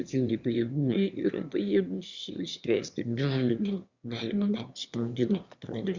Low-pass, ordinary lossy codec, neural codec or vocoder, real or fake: 7.2 kHz; Opus, 64 kbps; autoencoder, 22.05 kHz, a latent of 192 numbers a frame, VITS, trained on one speaker; fake